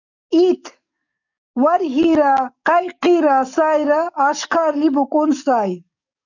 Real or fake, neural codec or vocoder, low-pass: fake; codec, 44.1 kHz, 7.8 kbps, DAC; 7.2 kHz